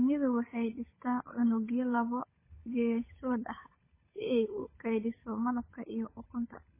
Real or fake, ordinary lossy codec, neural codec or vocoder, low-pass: fake; MP3, 16 kbps; codec, 16 kHz, 8 kbps, FunCodec, trained on Chinese and English, 25 frames a second; 3.6 kHz